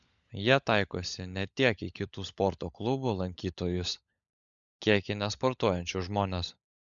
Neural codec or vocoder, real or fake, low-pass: codec, 16 kHz, 16 kbps, FunCodec, trained on LibriTTS, 50 frames a second; fake; 7.2 kHz